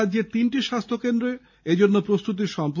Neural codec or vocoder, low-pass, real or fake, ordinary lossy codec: none; 7.2 kHz; real; none